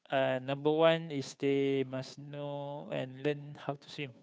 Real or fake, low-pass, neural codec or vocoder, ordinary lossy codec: fake; none; codec, 16 kHz, 2 kbps, FunCodec, trained on Chinese and English, 25 frames a second; none